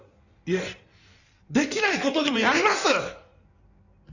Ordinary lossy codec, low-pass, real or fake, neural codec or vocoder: none; 7.2 kHz; fake; codec, 16 kHz in and 24 kHz out, 1.1 kbps, FireRedTTS-2 codec